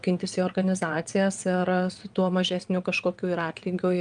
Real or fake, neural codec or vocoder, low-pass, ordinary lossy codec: fake; vocoder, 22.05 kHz, 80 mel bands, WaveNeXt; 9.9 kHz; Opus, 32 kbps